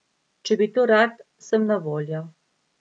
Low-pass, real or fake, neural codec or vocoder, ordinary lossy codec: 9.9 kHz; real; none; none